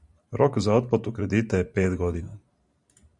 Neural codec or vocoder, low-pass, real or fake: vocoder, 44.1 kHz, 128 mel bands every 512 samples, BigVGAN v2; 10.8 kHz; fake